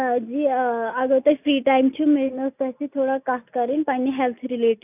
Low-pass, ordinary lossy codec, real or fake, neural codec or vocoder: 3.6 kHz; none; real; none